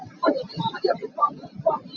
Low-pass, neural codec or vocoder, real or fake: 7.2 kHz; none; real